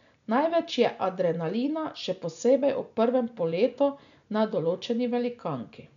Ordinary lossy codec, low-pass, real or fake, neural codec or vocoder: MP3, 96 kbps; 7.2 kHz; real; none